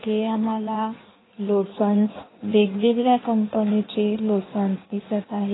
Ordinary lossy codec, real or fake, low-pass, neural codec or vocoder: AAC, 16 kbps; fake; 7.2 kHz; codec, 16 kHz in and 24 kHz out, 1.1 kbps, FireRedTTS-2 codec